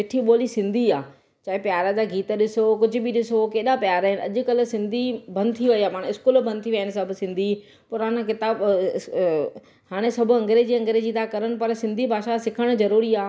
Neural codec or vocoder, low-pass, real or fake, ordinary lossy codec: none; none; real; none